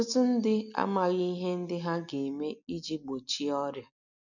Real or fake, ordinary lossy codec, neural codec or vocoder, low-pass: real; none; none; 7.2 kHz